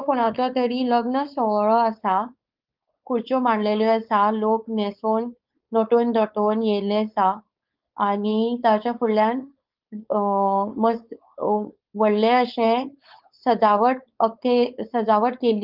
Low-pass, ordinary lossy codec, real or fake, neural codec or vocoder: 5.4 kHz; Opus, 24 kbps; fake; codec, 16 kHz, 4.8 kbps, FACodec